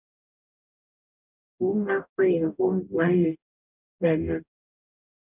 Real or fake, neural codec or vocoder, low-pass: fake; codec, 44.1 kHz, 0.9 kbps, DAC; 3.6 kHz